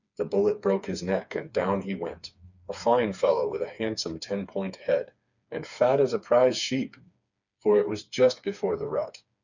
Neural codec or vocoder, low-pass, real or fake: codec, 16 kHz, 4 kbps, FreqCodec, smaller model; 7.2 kHz; fake